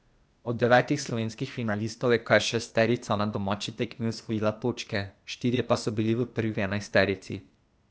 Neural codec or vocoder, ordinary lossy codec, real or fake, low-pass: codec, 16 kHz, 0.8 kbps, ZipCodec; none; fake; none